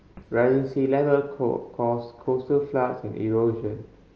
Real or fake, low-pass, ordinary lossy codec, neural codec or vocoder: real; 7.2 kHz; Opus, 24 kbps; none